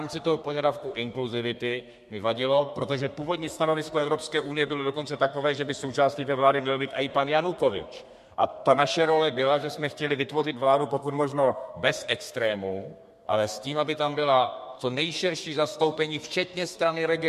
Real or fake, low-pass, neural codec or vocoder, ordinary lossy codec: fake; 14.4 kHz; codec, 32 kHz, 1.9 kbps, SNAC; MP3, 64 kbps